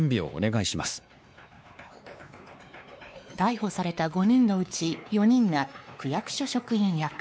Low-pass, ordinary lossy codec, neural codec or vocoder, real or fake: none; none; codec, 16 kHz, 2 kbps, X-Codec, WavLM features, trained on Multilingual LibriSpeech; fake